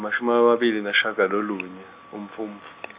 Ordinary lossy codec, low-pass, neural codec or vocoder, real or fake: Opus, 64 kbps; 3.6 kHz; none; real